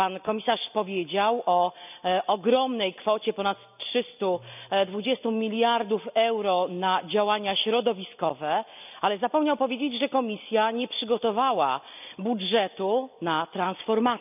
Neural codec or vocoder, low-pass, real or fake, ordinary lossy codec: none; 3.6 kHz; real; none